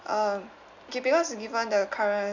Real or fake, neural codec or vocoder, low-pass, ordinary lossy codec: real; none; 7.2 kHz; none